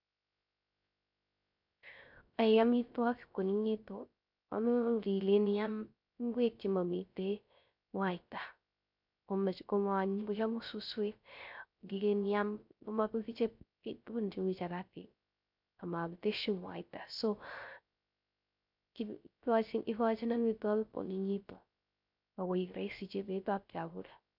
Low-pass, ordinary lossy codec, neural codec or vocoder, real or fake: 5.4 kHz; MP3, 48 kbps; codec, 16 kHz, 0.3 kbps, FocalCodec; fake